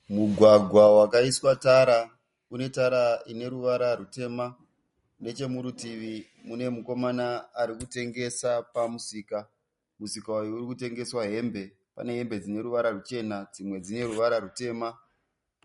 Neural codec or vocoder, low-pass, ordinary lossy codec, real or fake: none; 10.8 kHz; MP3, 48 kbps; real